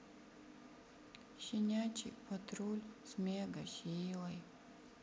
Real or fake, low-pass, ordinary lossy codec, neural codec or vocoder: real; none; none; none